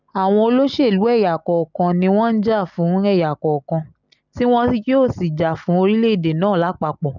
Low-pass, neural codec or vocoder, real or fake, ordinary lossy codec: 7.2 kHz; none; real; none